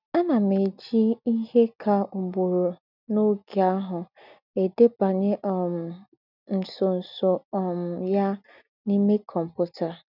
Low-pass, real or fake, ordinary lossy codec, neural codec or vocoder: 5.4 kHz; real; none; none